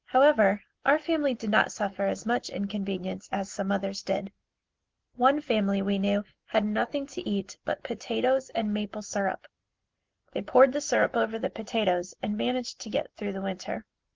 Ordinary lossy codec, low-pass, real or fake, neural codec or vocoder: Opus, 16 kbps; 7.2 kHz; real; none